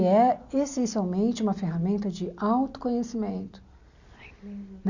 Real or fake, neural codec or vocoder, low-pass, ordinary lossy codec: real; none; 7.2 kHz; none